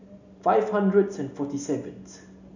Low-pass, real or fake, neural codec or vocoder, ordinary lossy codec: 7.2 kHz; real; none; none